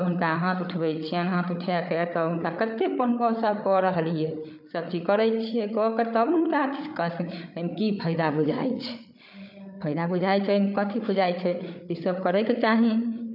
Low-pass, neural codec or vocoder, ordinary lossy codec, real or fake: 5.4 kHz; codec, 16 kHz, 8 kbps, FreqCodec, larger model; none; fake